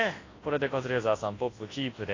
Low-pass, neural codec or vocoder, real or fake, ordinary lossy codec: 7.2 kHz; codec, 24 kHz, 0.9 kbps, WavTokenizer, large speech release; fake; AAC, 32 kbps